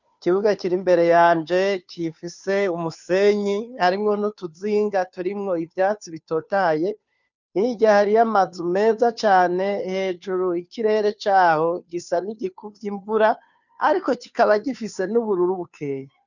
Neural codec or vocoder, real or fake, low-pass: codec, 16 kHz, 2 kbps, FunCodec, trained on Chinese and English, 25 frames a second; fake; 7.2 kHz